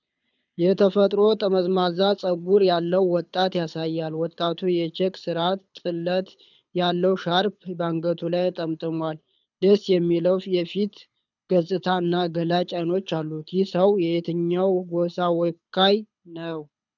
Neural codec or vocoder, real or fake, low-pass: codec, 24 kHz, 6 kbps, HILCodec; fake; 7.2 kHz